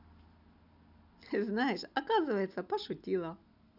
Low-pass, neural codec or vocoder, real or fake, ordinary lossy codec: 5.4 kHz; none; real; none